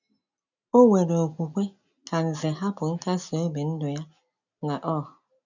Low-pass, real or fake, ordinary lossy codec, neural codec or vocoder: 7.2 kHz; real; none; none